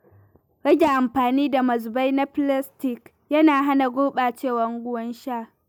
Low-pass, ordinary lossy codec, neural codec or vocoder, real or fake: 19.8 kHz; none; none; real